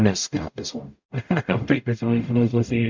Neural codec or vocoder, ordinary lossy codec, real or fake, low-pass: codec, 44.1 kHz, 0.9 kbps, DAC; MP3, 64 kbps; fake; 7.2 kHz